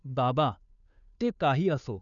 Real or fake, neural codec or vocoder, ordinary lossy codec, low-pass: fake; codec, 16 kHz, 2 kbps, FunCodec, trained on Chinese and English, 25 frames a second; none; 7.2 kHz